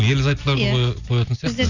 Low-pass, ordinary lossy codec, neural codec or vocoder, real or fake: 7.2 kHz; none; none; real